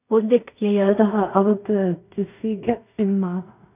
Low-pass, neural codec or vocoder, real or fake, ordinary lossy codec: 3.6 kHz; codec, 16 kHz in and 24 kHz out, 0.4 kbps, LongCat-Audio-Codec, two codebook decoder; fake; none